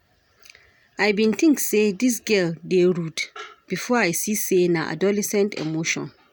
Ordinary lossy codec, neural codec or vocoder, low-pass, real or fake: none; none; none; real